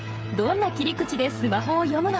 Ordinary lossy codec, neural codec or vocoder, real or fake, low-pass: none; codec, 16 kHz, 8 kbps, FreqCodec, smaller model; fake; none